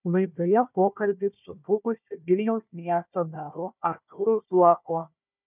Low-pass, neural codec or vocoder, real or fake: 3.6 kHz; codec, 16 kHz, 1 kbps, FunCodec, trained on Chinese and English, 50 frames a second; fake